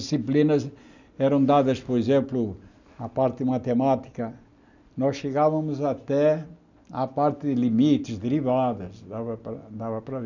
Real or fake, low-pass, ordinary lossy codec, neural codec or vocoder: real; 7.2 kHz; none; none